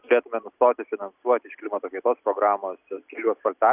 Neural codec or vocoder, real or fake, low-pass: none; real; 3.6 kHz